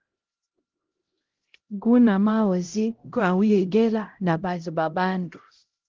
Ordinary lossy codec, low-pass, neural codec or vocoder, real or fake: Opus, 16 kbps; 7.2 kHz; codec, 16 kHz, 0.5 kbps, X-Codec, HuBERT features, trained on LibriSpeech; fake